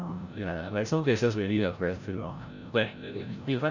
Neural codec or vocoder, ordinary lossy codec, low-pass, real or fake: codec, 16 kHz, 0.5 kbps, FreqCodec, larger model; none; 7.2 kHz; fake